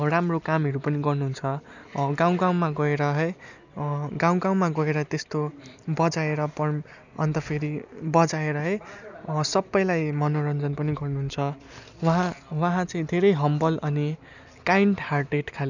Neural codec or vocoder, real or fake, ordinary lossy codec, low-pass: none; real; none; 7.2 kHz